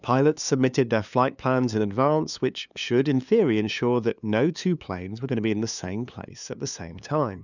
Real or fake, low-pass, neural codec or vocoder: fake; 7.2 kHz; codec, 16 kHz, 2 kbps, FunCodec, trained on LibriTTS, 25 frames a second